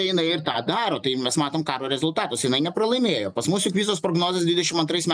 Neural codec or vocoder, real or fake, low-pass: none; real; 9.9 kHz